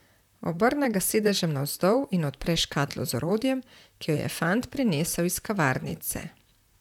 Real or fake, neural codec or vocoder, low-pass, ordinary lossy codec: fake; vocoder, 44.1 kHz, 128 mel bands, Pupu-Vocoder; 19.8 kHz; none